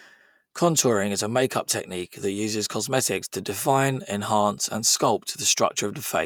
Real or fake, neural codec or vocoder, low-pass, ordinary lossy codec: fake; vocoder, 48 kHz, 128 mel bands, Vocos; none; none